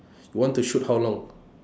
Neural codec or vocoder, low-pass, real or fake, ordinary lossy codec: none; none; real; none